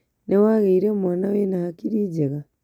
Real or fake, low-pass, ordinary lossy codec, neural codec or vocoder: real; 19.8 kHz; none; none